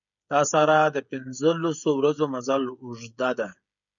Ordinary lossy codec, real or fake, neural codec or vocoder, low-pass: MP3, 96 kbps; fake; codec, 16 kHz, 16 kbps, FreqCodec, smaller model; 7.2 kHz